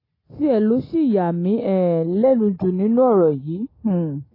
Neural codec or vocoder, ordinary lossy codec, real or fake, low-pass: none; AAC, 24 kbps; real; 5.4 kHz